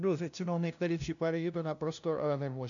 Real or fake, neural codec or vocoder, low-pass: fake; codec, 16 kHz, 0.5 kbps, FunCodec, trained on LibriTTS, 25 frames a second; 7.2 kHz